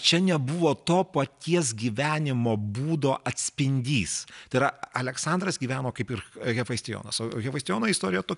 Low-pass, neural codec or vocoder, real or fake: 10.8 kHz; none; real